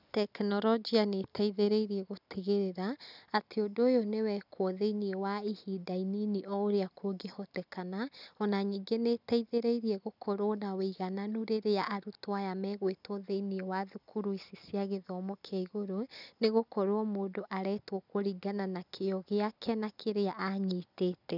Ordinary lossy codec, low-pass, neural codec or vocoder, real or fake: none; 5.4 kHz; none; real